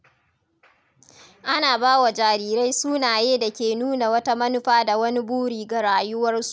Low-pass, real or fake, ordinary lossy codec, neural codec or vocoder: none; real; none; none